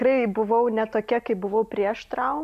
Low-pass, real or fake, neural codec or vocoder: 14.4 kHz; real; none